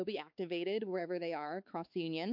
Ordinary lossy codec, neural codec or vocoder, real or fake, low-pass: MP3, 48 kbps; codec, 16 kHz, 4 kbps, X-Codec, WavLM features, trained on Multilingual LibriSpeech; fake; 5.4 kHz